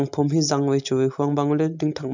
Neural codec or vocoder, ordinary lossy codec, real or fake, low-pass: none; none; real; 7.2 kHz